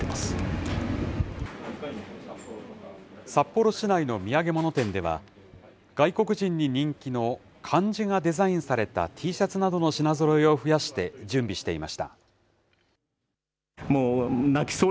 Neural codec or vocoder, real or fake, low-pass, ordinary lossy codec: none; real; none; none